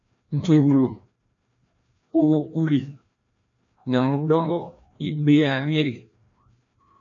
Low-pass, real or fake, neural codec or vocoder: 7.2 kHz; fake; codec, 16 kHz, 1 kbps, FreqCodec, larger model